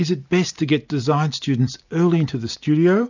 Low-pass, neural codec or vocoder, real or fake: 7.2 kHz; none; real